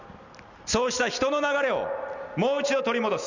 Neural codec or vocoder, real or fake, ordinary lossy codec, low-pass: none; real; none; 7.2 kHz